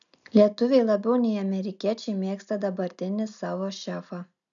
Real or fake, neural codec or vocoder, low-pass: real; none; 7.2 kHz